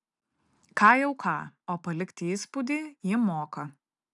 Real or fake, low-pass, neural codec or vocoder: real; 10.8 kHz; none